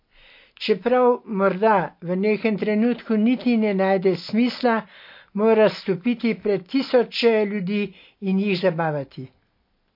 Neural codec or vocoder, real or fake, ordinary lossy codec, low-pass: none; real; MP3, 32 kbps; 5.4 kHz